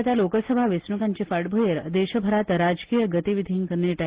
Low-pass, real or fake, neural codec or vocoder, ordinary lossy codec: 3.6 kHz; real; none; Opus, 16 kbps